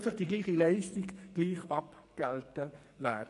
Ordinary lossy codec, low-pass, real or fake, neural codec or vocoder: MP3, 48 kbps; 14.4 kHz; fake; codec, 44.1 kHz, 2.6 kbps, SNAC